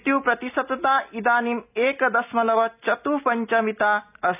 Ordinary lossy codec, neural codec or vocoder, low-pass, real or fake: none; none; 3.6 kHz; real